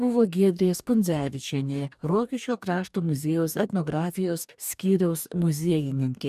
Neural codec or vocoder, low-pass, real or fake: codec, 44.1 kHz, 2.6 kbps, DAC; 14.4 kHz; fake